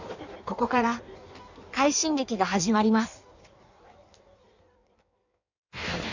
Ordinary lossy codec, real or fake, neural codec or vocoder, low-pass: none; fake; codec, 16 kHz in and 24 kHz out, 1.1 kbps, FireRedTTS-2 codec; 7.2 kHz